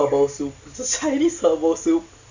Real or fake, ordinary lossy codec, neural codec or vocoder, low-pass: real; Opus, 64 kbps; none; 7.2 kHz